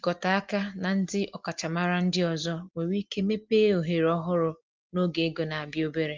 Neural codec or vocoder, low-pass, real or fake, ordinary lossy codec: none; 7.2 kHz; real; Opus, 32 kbps